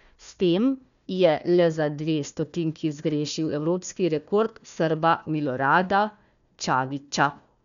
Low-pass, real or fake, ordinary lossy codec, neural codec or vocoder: 7.2 kHz; fake; none; codec, 16 kHz, 1 kbps, FunCodec, trained on Chinese and English, 50 frames a second